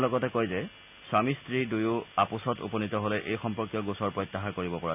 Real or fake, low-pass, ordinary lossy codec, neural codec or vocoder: real; 3.6 kHz; none; none